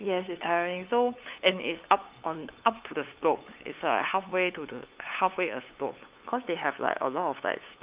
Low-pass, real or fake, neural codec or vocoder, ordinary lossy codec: 3.6 kHz; fake; codec, 16 kHz, 8 kbps, FunCodec, trained on LibriTTS, 25 frames a second; Opus, 24 kbps